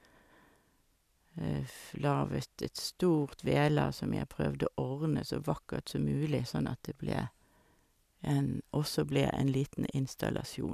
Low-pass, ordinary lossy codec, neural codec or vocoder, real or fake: 14.4 kHz; none; none; real